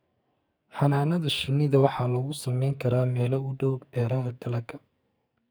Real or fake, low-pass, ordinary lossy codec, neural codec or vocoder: fake; none; none; codec, 44.1 kHz, 2.6 kbps, SNAC